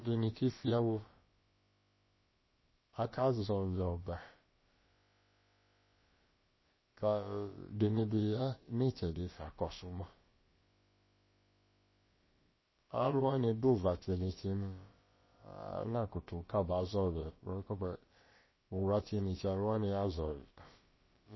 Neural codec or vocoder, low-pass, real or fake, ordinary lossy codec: codec, 16 kHz, about 1 kbps, DyCAST, with the encoder's durations; 7.2 kHz; fake; MP3, 24 kbps